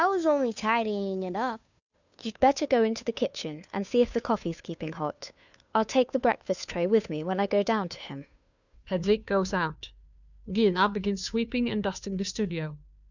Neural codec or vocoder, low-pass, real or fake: codec, 16 kHz, 2 kbps, FunCodec, trained on Chinese and English, 25 frames a second; 7.2 kHz; fake